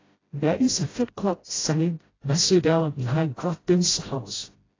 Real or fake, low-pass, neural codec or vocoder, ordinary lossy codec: fake; 7.2 kHz; codec, 16 kHz, 0.5 kbps, FreqCodec, smaller model; AAC, 32 kbps